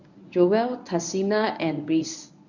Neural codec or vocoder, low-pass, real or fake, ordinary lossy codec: codec, 24 kHz, 0.9 kbps, WavTokenizer, medium speech release version 1; 7.2 kHz; fake; none